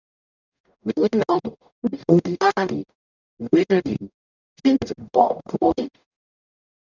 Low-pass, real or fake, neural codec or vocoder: 7.2 kHz; fake; codec, 44.1 kHz, 0.9 kbps, DAC